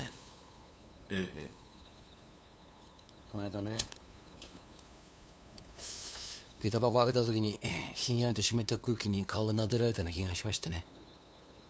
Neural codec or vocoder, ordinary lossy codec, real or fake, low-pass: codec, 16 kHz, 2 kbps, FunCodec, trained on LibriTTS, 25 frames a second; none; fake; none